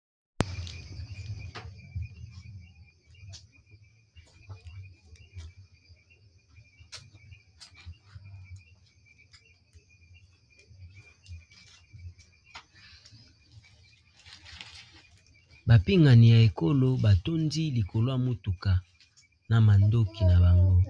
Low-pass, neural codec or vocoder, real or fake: 9.9 kHz; none; real